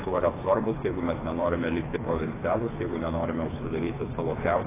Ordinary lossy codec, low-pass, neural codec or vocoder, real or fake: AAC, 16 kbps; 3.6 kHz; codec, 16 kHz, 4 kbps, FreqCodec, smaller model; fake